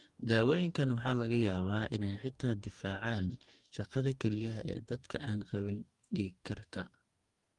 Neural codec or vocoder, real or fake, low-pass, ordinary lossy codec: codec, 44.1 kHz, 2.6 kbps, DAC; fake; 10.8 kHz; Opus, 32 kbps